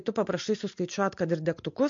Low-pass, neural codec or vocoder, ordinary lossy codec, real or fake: 7.2 kHz; none; MP3, 48 kbps; real